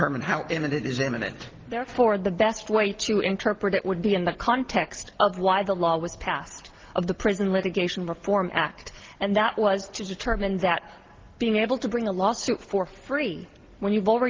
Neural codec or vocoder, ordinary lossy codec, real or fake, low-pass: none; Opus, 16 kbps; real; 7.2 kHz